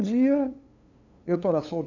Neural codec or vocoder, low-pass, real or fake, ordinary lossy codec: codec, 16 kHz, 2 kbps, FunCodec, trained on LibriTTS, 25 frames a second; 7.2 kHz; fake; none